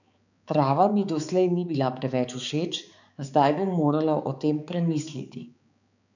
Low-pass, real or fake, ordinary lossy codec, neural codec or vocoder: 7.2 kHz; fake; none; codec, 16 kHz, 4 kbps, X-Codec, HuBERT features, trained on balanced general audio